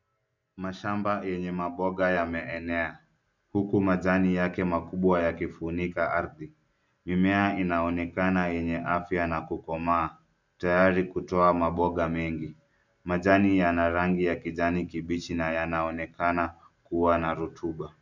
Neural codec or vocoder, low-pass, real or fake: none; 7.2 kHz; real